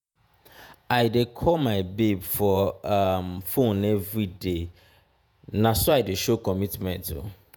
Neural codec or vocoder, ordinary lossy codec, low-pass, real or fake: vocoder, 48 kHz, 128 mel bands, Vocos; none; none; fake